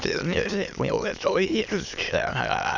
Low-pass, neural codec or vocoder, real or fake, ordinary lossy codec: 7.2 kHz; autoencoder, 22.05 kHz, a latent of 192 numbers a frame, VITS, trained on many speakers; fake; none